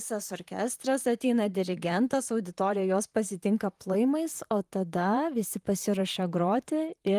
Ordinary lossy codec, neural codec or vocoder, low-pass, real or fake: Opus, 32 kbps; vocoder, 44.1 kHz, 128 mel bands, Pupu-Vocoder; 14.4 kHz; fake